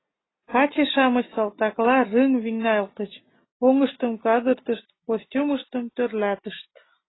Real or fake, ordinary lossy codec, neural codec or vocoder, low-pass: real; AAC, 16 kbps; none; 7.2 kHz